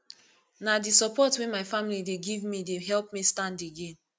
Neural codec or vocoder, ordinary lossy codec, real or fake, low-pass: none; none; real; none